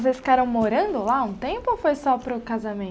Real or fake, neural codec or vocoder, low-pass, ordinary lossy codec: real; none; none; none